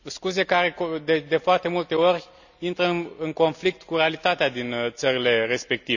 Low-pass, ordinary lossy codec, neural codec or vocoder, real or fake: 7.2 kHz; none; none; real